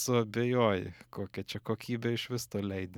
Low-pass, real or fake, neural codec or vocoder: 19.8 kHz; real; none